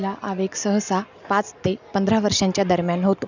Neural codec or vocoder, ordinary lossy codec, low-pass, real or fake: none; none; 7.2 kHz; real